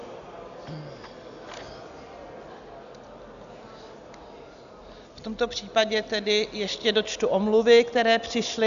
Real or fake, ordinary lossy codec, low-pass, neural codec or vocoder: real; AAC, 96 kbps; 7.2 kHz; none